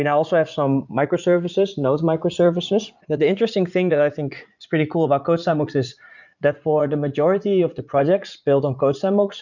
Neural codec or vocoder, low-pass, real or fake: vocoder, 44.1 kHz, 80 mel bands, Vocos; 7.2 kHz; fake